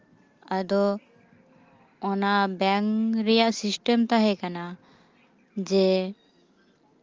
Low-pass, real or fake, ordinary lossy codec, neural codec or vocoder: 7.2 kHz; real; Opus, 32 kbps; none